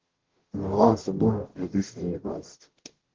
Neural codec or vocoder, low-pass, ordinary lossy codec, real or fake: codec, 44.1 kHz, 0.9 kbps, DAC; 7.2 kHz; Opus, 16 kbps; fake